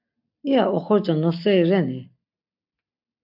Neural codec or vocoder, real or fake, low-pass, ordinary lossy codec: none; real; 5.4 kHz; AAC, 48 kbps